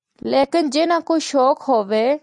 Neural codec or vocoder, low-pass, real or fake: none; 10.8 kHz; real